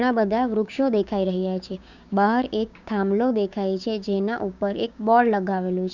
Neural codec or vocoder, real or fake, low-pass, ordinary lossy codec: codec, 16 kHz, 6 kbps, DAC; fake; 7.2 kHz; none